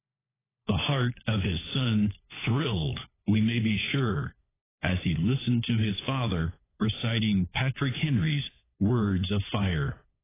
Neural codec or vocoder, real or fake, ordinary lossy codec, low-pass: codec, 16 kHz, 4 kbps, FunCodec, trained on LibriTTS, 50 frames a second; fake; AAC, 16 kbps; 3.6 kHz